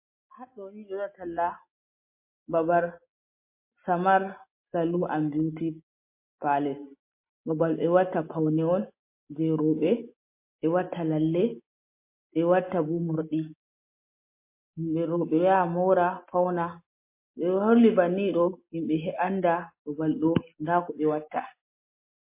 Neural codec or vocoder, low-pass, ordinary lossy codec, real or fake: none; 3.6 kHz; MP3, 24 kbps; real